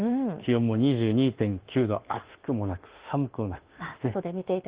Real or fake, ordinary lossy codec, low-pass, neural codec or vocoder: fake; Opus, 16 kbps; 3.6 kHz; autoencoder, 48 kHz, 32 numbers a frame, DAC-VAE, trained on Japanese speech